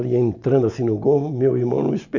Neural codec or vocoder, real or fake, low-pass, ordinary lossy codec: none; real; 7.2 kHz; MP3, 48 kbps